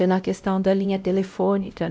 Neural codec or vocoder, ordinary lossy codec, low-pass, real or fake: codec, 16 kHz, 0.5 kbps, X-Codec, WavLM features, trained on Multilingual LibriSpeech; none; none; fake